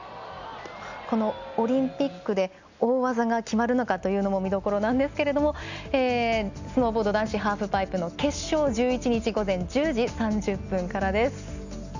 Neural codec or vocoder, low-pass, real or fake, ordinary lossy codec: none; 7.2 kHz; real; none